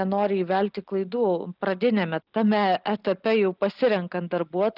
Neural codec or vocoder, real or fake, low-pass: none; real; 5.4 kHz